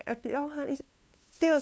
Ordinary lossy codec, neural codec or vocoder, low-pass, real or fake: none; codec, 16 kHz, 2 kbps, FunCodec, trained on LibriTTS, 25 frames a second; none; fake